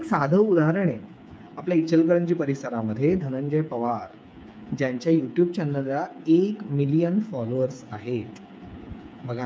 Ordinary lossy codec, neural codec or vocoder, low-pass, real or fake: none; codec, 16 kHz, 8 kbps, FreqCodec, smaller model; none; fake